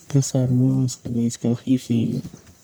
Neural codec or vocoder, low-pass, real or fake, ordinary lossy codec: codec, 44.1 kHz, 1.7 kbps, Pupu-Codec; none; fake; none